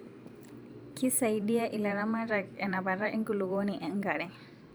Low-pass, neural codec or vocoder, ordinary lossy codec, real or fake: none; vocoder, 44.1 kHz, 128 mel bands every 512 samples, BigVGAN v2; none; fake